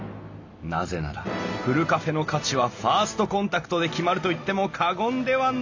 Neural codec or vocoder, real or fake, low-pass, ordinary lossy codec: none; real; 7.2 kHz; AAC, 48 kbps